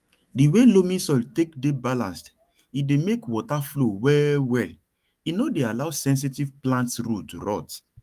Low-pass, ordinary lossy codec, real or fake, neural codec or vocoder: 14.4 kHz; Opus, 32 kbps; fake; autoencoder, 48 kHz, 128 numbers a frame, DAC-VAE, trained on Japanese speech